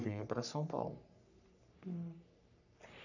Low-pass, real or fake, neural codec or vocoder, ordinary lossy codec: 7.2 kHz; fake; codec, 44.1 kHz, 3.4 kbps, Pupu-Codec; none